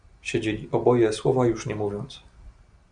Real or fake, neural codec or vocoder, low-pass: real; none; 9.9 kHz